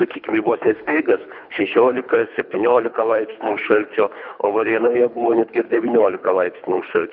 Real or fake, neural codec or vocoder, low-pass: fake; codec, 24 kHz, 3 kbps, HILCodec; 5.4 kHz